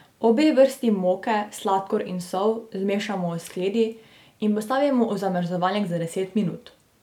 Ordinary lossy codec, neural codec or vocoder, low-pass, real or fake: none; none; 19.8 kHz; real